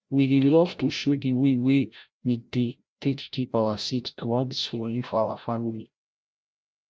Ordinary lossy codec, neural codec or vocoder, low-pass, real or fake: none; codec, 16 kHz, 0.5 kbps, FreqCodec, larger model; none; fake